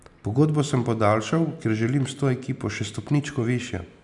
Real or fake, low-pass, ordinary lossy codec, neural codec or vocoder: real; 10.8 kHz; none; none